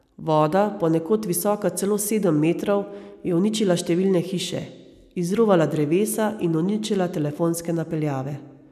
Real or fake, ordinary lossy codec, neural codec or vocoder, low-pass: real; none; none; 14.4 kHz